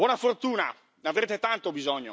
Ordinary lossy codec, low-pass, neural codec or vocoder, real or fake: none; none; none; real